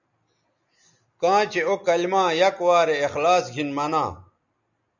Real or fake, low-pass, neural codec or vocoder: real; 7.2 kHz; none